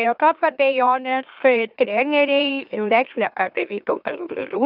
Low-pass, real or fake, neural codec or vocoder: 5.4 kHz; fake; autoencoder, 44.1 kHz, a latent of 192 numbers a frame, MeloTTS